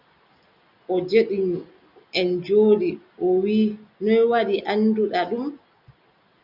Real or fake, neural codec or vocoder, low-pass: real; none; 5.4 kHz